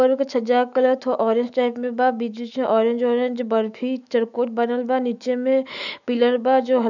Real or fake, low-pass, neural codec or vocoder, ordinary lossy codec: fake; 7.2 kHz; autoencoder, 48 kHz, 128 numbers a frame, DAC-VAE, trained on Japanese speech; none